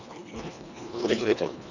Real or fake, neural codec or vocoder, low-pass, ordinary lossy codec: fake; codec, 24 kHz, 1.5 kbps, HILCodec; 7.2 kHz; none